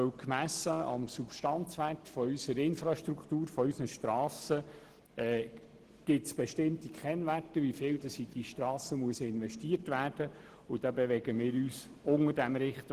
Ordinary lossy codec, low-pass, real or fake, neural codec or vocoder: Opus, 16 kbps; 14.4 kHz; real; none